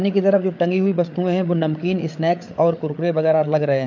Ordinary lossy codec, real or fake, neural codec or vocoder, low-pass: MP3, 48 kbps; fake; codec, 16 kHz, 8 kbps, FreqCodec, larger model; 7.2 kHz